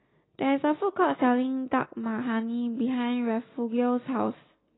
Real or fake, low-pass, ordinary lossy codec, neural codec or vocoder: real; 7.2 kHz; AAC, 16 kbps; none